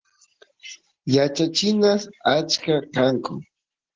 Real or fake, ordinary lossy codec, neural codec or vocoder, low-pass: real; Opus, 16 kbps; none; 7.2 kHz